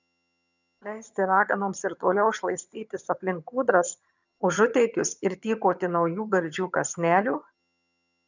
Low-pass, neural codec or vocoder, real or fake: 7.2 kHz; vocoder, 22.05 kHz, 80 mel bands, HiFi-GAN; fake